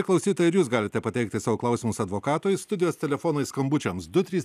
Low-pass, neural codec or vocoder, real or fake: 14.4 kHz; none; real